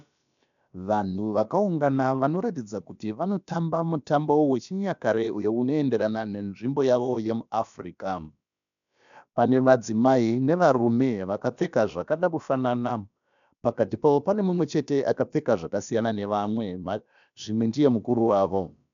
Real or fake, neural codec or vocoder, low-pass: fake; codec, 16 kHz, about 1 kbps, DyCAST, with the encoder's durations; 7.2 kHz